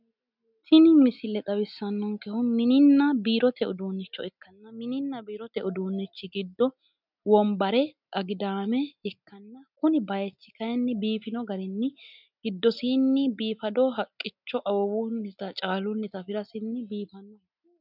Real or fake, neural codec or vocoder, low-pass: real; none; 5.4 kHz